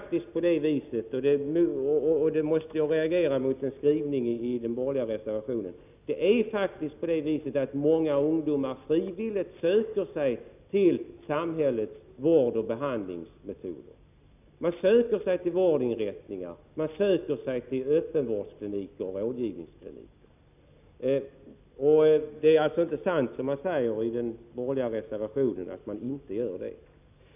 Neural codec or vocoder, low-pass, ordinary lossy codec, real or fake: none; 3.6 kHz; none; real